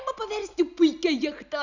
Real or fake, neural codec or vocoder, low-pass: real; none; 7.2 kHz